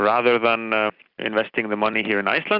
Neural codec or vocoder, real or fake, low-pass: none; real; 5.4 kHz